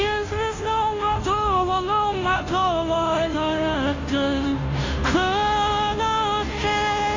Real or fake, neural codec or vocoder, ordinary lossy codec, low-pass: fake; codec, 16 kHz, 0.5 kbps, FunCodec, trained on Chinese and English, 25 frames a second; AAC, 32 kbps; 7.2 kHz